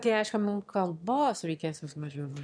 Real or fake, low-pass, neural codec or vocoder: fake; 9.9 kHz; autoencoder, 22.05 kHz, a latent of 192 numbers a frame, VITS, trained on one speaker